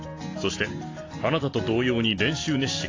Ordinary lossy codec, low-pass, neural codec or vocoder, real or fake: AAC, 32 kbps; 7.2 kHz; none; real